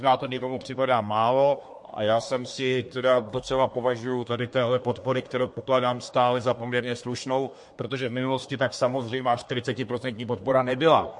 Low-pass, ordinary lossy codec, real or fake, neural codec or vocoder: 10.8 kHz; MP3, 48 kbps; fake; codec, 24 kHz, 1 kbps, SNAC